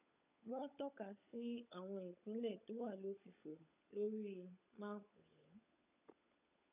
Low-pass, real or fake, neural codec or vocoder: 3.6 kHz; fake; codec, 16 kHz, 16 kbps, FunCodec, trained on LibriTTS, 50 frames a second